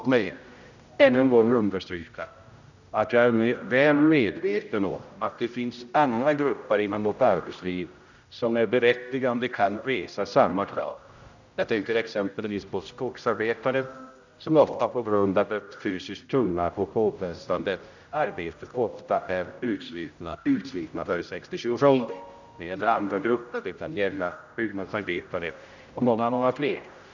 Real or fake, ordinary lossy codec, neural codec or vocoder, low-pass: fake; none; codec, 16 kHz, 0.5 kbps, X-Codec, HuBERT features, trained on general audio; 7.2 kHz